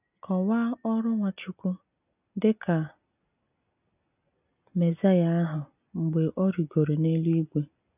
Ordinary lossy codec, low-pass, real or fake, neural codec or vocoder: none; 3.6 kHz; real; none